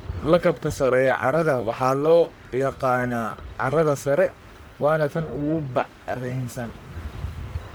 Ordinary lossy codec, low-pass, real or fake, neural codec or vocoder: none; none; fake; codec, 44.1 kHz, 1.7 kbps, Pupu-Codec